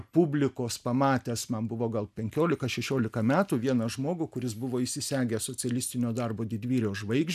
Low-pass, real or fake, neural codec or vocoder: 14.4 kHz; real; none